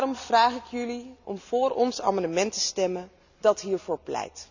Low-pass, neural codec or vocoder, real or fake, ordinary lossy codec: 7.2 kHz; none; real; none